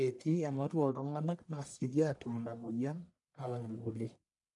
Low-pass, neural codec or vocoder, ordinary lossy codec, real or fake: 10.8 kHz; codec, 44.1 kHz, 1.7 kbps, Pupu-Codec; none; fake